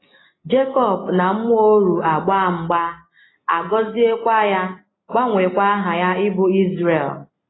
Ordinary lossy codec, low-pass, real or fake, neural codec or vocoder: AAC, 16 kbps; 7.2 kHz; real; none